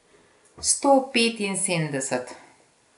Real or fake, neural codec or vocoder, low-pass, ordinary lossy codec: real; none; 10.8 kHz; none